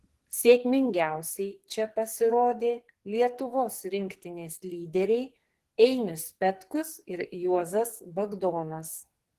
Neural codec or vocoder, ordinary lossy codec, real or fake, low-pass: codec, 44.1 kHz, 2.6 kbps, SNAC; Opus, 16 kbps; fake; 14.4 kHz